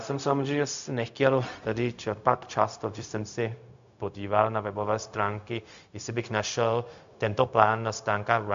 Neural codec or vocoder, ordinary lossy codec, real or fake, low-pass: codec, 16 kHz, 0.4 kbps, LongCat-Audio-Codec; MP3, 64 kbps; fake; 7.2 kHz